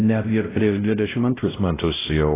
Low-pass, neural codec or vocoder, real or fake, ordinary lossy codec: 3.6 kHz; codec, 16 kHz, 0.5 kbps, X-Codec, WavLM features, trained on Multilingual LibriSpeech; fake; AAC, 16 kbps